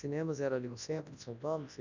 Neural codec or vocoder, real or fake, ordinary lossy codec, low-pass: codec, 24 kHz, 0.9 kbps, WavTokenizer, large speech release; fake; none; 7.2 kHz